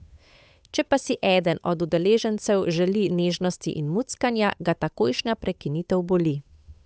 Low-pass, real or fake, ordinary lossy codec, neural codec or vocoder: none; fake; none; codec, 16 kHz, 8 kbps, FunCodec, trained on Chinese and English, 25 frames a second